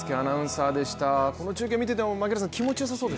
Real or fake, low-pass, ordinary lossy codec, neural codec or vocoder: real; none; none; none